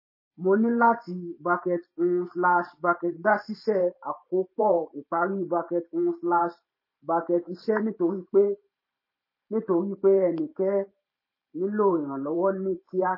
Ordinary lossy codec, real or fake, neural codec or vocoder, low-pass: MP3, 24 kbps; fake; vocoder, 44.1 kHz, 128 mel bands every 512 samples, BigVGAN v2; 5.4 kHz